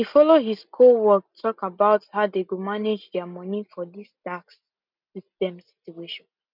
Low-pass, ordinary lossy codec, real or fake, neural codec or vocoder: 5.4 kHz; none; real; none